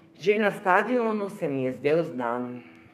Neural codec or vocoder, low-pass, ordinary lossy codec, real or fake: codec, 32 kHz, 1.9 kbps, SNAC; 14.4 kHz; none; fake